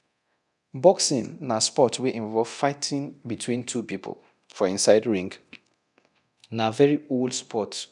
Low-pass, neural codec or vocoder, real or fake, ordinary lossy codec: 10.8 kHz; codec, 24 kHz, 0.9 kbps, DualCodec; fake; none